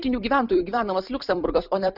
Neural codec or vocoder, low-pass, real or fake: vocoder, 44.1 kHz, 128 mel bands every 256 samples, BigVGAN v2; 5.4 kHz; fake